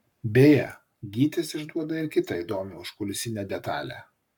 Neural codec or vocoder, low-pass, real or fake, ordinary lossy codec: codec, 44.1 kHz, 7.8 kbps, Pupu-Codec; 19.8 kHz; fake; MP3, 96 kbps